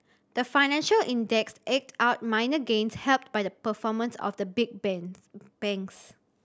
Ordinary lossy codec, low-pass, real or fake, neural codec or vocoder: none; none; real; none